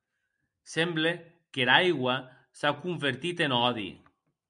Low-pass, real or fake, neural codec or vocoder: 9.9 kHz; real; none